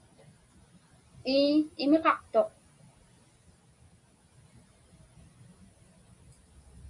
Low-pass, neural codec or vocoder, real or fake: 10.8 kHz; none; real